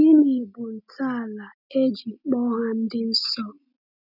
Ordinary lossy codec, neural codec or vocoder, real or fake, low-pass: none; none; real; 5.4 kHz